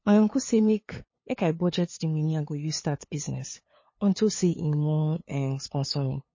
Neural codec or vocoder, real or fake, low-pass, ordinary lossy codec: codec, 16 kHz, 2 kbps, FunCodec, trained on LibriTTS, 25 frames a second; fake; 7.2 kHz; MP3, 32 kbps